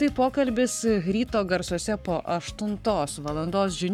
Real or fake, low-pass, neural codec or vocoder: fake; 19.8 kHz; codec, 44.1 kHz, 7.8 kbps, Pupu-Codec